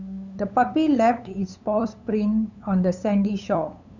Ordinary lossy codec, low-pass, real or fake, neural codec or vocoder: none; 7.2 kHz; fake; codec, 16 kHz, 8 kbps, FunCodec, trained on LibriTTS, 25 frames a second